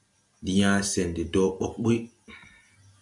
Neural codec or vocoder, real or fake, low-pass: none; real; 10.8 kHz